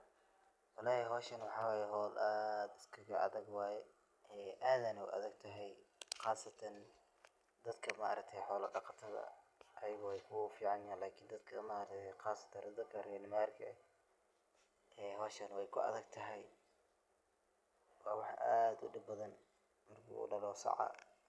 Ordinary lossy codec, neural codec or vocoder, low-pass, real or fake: none; none; none; real